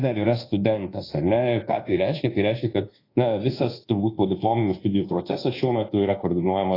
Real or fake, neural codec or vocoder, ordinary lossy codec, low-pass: fake; codec, 24 kHz, 1.2 kbps, DualCodec; AAC, 24 kbps; 5.4 kHz